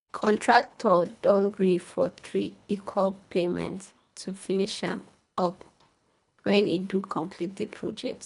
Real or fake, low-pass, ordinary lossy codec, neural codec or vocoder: fake; 10.8 kHz; none; codec, 24 kHz, 1.5 kbps, HILCodec